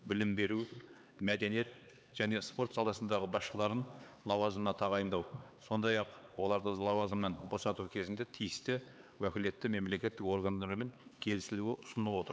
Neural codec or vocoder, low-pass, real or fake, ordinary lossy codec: codec, 16 kHz, 4 kbps, X-Codec, HuBERT features, trained on LibriSpeech; none; fake; none